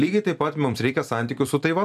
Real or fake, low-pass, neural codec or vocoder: fake; 14.4 kHz; vocoder, 44.1 kHz, 128 mel bands every 256 samples, BigVGAN v2